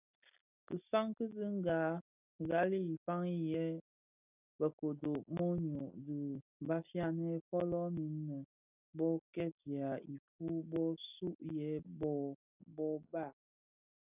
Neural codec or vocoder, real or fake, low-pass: none; real; 3.6 kHz